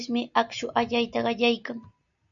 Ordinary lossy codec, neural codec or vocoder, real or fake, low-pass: MP3, 64 kbps; none; real; 7.2 kHz